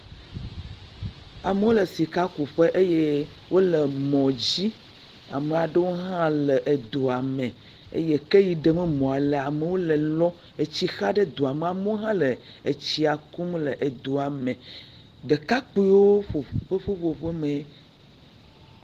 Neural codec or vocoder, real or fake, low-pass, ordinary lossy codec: none; real; 14.4 kHz; Opus, 16 kbps